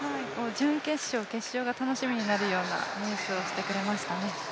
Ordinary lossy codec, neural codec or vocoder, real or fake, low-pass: none; none; real; none